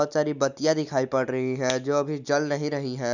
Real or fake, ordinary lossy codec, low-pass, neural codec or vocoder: real; none; 7.2 kHz; none